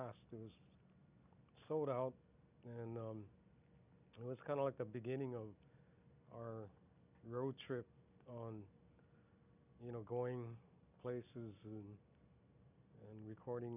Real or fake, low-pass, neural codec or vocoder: real; 3.6 kHz; none